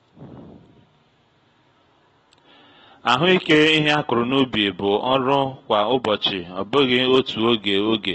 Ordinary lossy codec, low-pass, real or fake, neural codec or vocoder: AAC, 24 kbps; 19.8 kHz; real; none